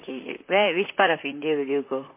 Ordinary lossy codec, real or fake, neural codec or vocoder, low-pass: MP3, 24 kbps; fake; vocoder, 44.1 kHz, 128 mel bands, Pupu-Vocoder; 3.6 kHz